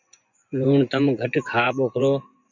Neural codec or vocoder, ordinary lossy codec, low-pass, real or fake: vocoder, 24 kHz, 100 mel bands, Vocos; MP3, 64 kbps; 7.2 kHz; fake